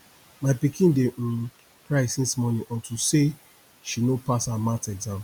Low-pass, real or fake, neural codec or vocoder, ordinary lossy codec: none; real; none; none